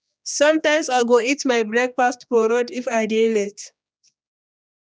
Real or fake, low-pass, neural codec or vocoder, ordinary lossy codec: fake; none; codec, 16 kHz, 2 kbps, X-Codec, HuBERT features, trained on general audio; none